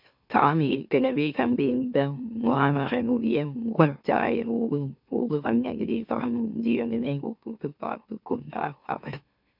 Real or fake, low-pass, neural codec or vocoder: fake; 5.4 kHz; autoencoder, 44.1 kHz, a latent of 192 numbers a frame, MeloTTS